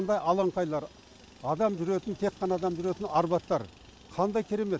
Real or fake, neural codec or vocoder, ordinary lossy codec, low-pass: real; none; none; none